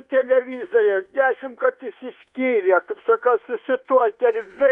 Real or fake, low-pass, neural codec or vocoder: fake; 10.8 kHz; codec, 24 kHz, 1.2 kbps, DualCodec